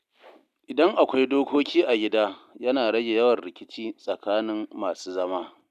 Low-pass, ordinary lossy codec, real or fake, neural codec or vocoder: 14.4 kHz; none; real; none